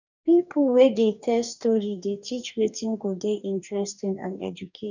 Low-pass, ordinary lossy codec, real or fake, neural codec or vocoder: 7.2 kHz; none; fake; codec, 44.1 kHz, 2.6 kbps, DAC